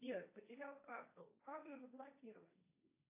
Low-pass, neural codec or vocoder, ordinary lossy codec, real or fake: 3.6 kHz; codec, 16 kHz, 1.1 kbps, Voila-Tokenizer; AAC, 32 kbps; fake